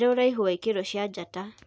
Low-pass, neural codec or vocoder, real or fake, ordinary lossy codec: none; none; real; none